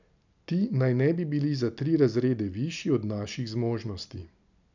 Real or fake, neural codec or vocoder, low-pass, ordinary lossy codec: real; none; 7.2 kHz; none